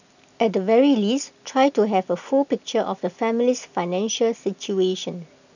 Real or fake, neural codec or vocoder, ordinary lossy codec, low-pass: real; none; none; 7.2 kHz